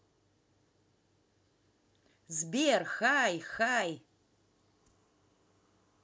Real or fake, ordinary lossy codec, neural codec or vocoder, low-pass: real; none; none; none